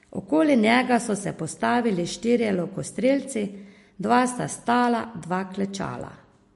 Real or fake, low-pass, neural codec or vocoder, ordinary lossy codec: real; 14.4 kHz; none; MP3, 48 kbps